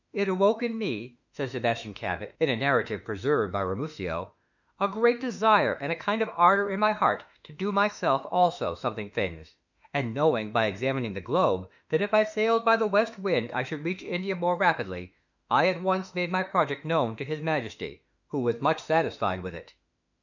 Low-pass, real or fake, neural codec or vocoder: 7.2 kHz; fake; autoencoder, 48 kHz, 32 numbers a frame, DAC-VAE, trained on Japanese speech